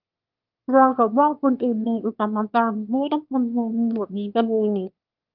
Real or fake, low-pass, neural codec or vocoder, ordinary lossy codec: fake; 5.4 kHz; autoencoder, 22.05 kHz, a latent of 192 numbers a frame, VITS, trained on one speaker; Opus, 32 kbps